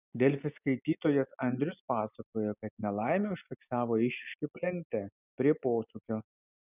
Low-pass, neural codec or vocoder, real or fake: 3.6 kHz; none; real